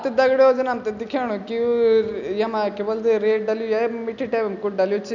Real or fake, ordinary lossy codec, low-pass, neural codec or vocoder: real; none; 7.2 kHz; none